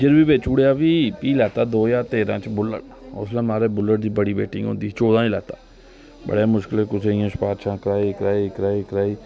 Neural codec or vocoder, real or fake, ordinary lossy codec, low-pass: none; real; none; none